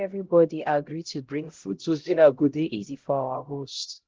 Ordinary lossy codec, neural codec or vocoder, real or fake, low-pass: Opus, 16 kbps; codec, 16 kHz, 0.5 kbps, X-Codec, HuBERT features, trained on LibriSpeech; fake; 7.2 kHz